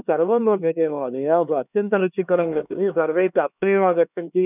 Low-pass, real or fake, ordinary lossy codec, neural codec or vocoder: 3.6 kHz; fake; none; codec, 16 kHz, 2 kbps, X-Codec, HuBERT features, trained on LibriSpeech